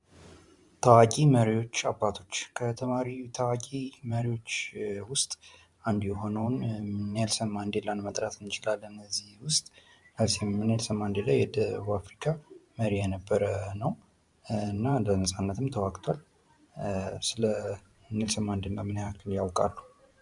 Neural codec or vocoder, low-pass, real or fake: vocoder, 44.1 kHz, 128 mel bands every 512 samples, BigVGAN v2; 10.8 kHz; fake